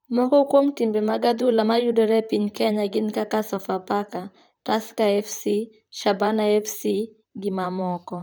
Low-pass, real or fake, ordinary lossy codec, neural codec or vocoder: none; fake; none; vocoder, 44.1 kHz, 128 mel bands, Pupu-Vocoder